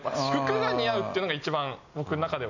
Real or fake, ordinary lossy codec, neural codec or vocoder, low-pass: real; none; none; 7.2 kHz